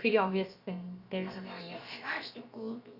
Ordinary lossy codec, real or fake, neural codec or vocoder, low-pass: Opus, 64 kbps; fake; codec, 16 kHz, about 1 kbps, DyCAST, with the encoder's durations; 5.4 kHz